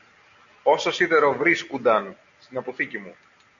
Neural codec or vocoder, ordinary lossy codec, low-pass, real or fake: none; MP3, 64 kbps; 7.2 kHz; real